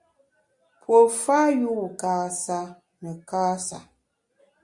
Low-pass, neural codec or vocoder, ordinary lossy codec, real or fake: 10.8 kHz; none; Opus, 64 kbps; real